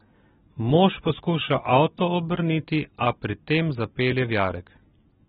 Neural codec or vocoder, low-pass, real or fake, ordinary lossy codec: none; 19.8 kHz; real; AAC, 16 kbps